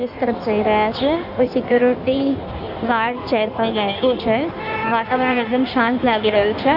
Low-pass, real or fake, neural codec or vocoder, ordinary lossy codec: 5.4 kHz; fake; codec, 16 kHz in and 24 kHz out, 1.1 kbps, FireRedTTS-2 codec; none